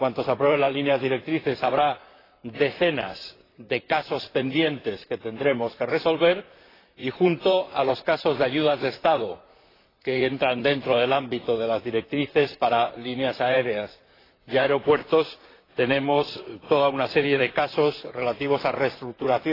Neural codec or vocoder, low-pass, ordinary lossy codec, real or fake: vocoder, 44.1 kHz, 128 mel bands, Pupu-Vocoder; 5.4 kHz; AAC, 24 kbps; fake